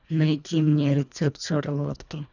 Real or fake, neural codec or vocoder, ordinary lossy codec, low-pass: fake; codec, 24 kHz, 1.5 kbps, HILCodec; none; 7.2 kHz